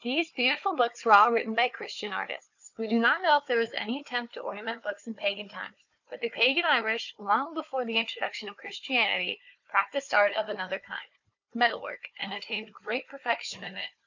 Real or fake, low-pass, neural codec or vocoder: fake; 7.2 kHz; codec, 16 kHz, 4 kbps, FunCodec, trained on Chinese and English, 50 frames a second